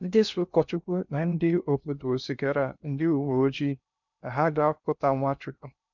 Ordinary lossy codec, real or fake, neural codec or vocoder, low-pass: none; fake; codec, 16 kHz in and 24 kHz out, 0.6 kbps, FocalCodec, streaming, 2048 codes; 7.2 kHz